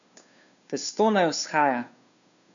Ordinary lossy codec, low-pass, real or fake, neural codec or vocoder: none; 7.2 kHz; fake; codec, 16 kHz, 2 kbps, FunCodec, trained on Chinese and English, 25 frames a second